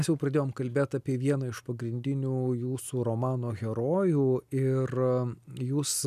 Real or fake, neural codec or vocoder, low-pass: real; none; 14.4 kHz